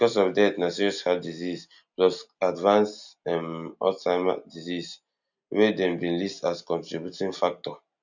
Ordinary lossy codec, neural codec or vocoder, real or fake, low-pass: none; none; real; 7.2 kHz